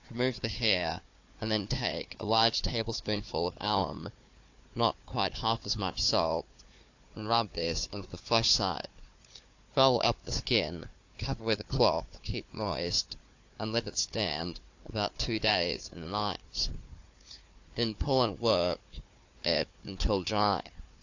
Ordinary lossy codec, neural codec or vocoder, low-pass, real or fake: AAC, 48 kbps; codec, 16 kHz, 4 kbps, FunCodec, trained on Chinese and English, 50 frames a second; 7.2 kHz; fake